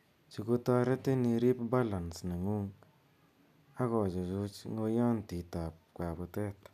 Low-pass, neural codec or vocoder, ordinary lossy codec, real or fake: 14.4 kHz; none; none; real